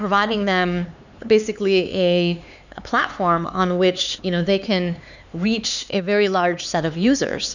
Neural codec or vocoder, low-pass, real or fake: codec, 16 kHz, 4 kbps, X-Codec, HuBERT features, trained on LibriSpeech; 7.2 kHz; fake